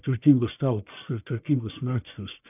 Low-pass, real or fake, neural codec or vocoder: 3.6 kHz; fake; codec, 44.1 kHz, 1.7 kbps, Pupu-Codec